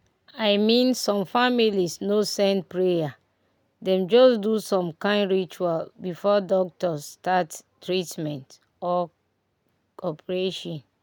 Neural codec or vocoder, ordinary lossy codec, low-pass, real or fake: none; none; none; real